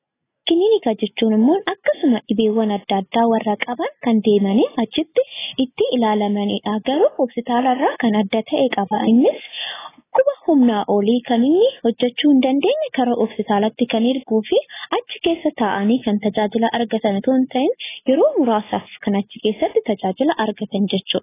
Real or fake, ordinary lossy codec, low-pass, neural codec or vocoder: real; AAC, 16 kbps; 3.6 kHz; none